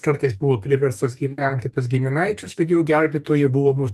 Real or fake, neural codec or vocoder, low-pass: fake; codec, 44.1 kHz, 2.6 kbps, DAC; 14.4 kHz